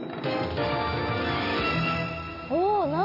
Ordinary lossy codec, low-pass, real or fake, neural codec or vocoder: none; 5.4 kHz; real; none